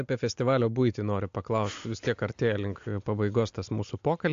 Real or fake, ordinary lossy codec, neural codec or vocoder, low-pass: real; AAC, 64 kbps; none; 7.2 kHz